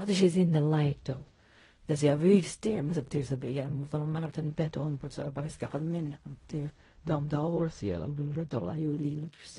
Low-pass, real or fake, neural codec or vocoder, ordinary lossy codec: 10.8 kHz; fake; codec, 16 kHz in and 24 kHz out, 0.4 kbps, LongCat-Audio-Codec, fine tuned four codebook decoder; AAC, 32 kbps